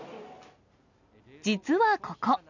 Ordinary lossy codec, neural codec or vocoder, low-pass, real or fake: none; none; 7.2 kHz; real